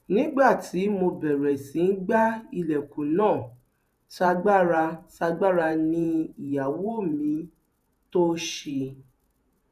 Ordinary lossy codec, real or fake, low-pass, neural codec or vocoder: none; fake; 14.4 kHz; vocoder, 48 kHz, 128 mel bands, Vocos